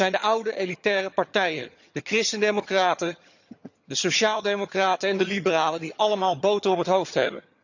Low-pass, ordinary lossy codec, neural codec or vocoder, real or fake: 7.2 kHz; none; vocoder, 22.05 kHz, 80 mel bands, HiFi-GAN; fake